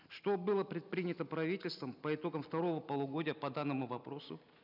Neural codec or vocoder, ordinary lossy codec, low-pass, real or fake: none; none; 5.4 kHz; real